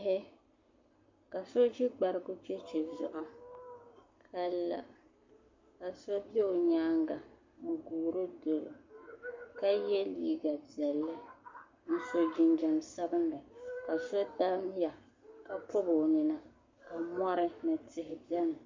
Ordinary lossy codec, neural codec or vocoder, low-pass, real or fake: MP3, 48 kbps; codec, 44.1 kHz, 7.8 kbps, Pupu-Codec; 7.2 kHz; fake